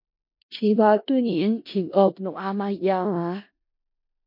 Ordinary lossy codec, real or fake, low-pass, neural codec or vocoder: MP3, 32 kbps; fake; 5.4 kHz; codec, 16 kHz in and 24 kHz out, 0.4 kbps, LongCat-Audio-Codec, four codebook decoder